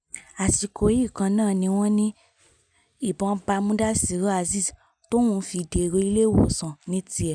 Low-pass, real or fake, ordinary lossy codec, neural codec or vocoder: 9.9 kHz; real; none; none